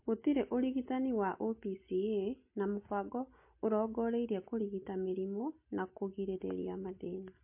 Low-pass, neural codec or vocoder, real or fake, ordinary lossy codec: 3.6 kHz; none; real; MP3, 24 kbps